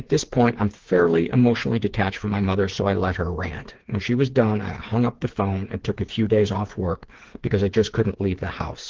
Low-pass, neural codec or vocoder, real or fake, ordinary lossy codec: 7.2 kHz; codec, 16 kHz, 4 kbps, FreqCodec, smaller model; fake; Opus, 16 kbps